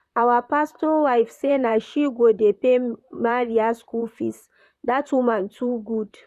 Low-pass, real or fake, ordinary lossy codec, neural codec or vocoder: 14.4 kHz; fake; none; vocoder, 44.1 kHz, 128 mel bands, Pupu-Vocoder